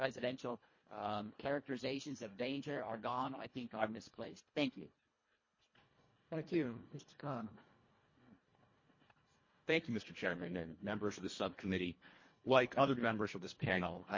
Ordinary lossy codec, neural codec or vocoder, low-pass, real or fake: MP3, 32 kbps; codec, 24 kHz, 1.5 kbps, HILCodec; 7.2 kHz; fake